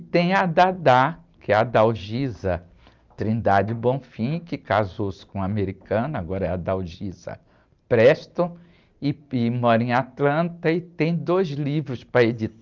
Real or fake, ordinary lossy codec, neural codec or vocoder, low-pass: real; Opus, 32 kbps; none; 7.2 kHz